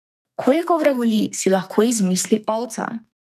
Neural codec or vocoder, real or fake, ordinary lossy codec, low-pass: codec, 32 kHz, 1.9 kbps, SNAC; fake; none; 14.4 kHz